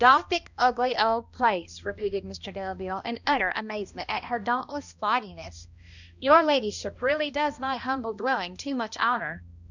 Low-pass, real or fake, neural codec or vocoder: 7.2 kHz; fake; codec, 16 kHz, 1 kbps, X-Codec, HuBERT features, trained on balanced general audio